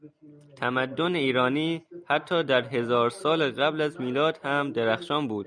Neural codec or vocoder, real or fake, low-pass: none; real; 9.9 kHz